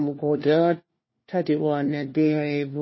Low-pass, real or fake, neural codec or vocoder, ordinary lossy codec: 7.2 kHz; fake; codec, 16 kHz, 1 kbps, FunCodec, trained on LibriTTS, 50 frames a second; MP3, 24 kbps